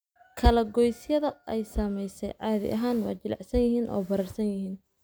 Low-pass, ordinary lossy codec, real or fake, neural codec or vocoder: none; none; real; none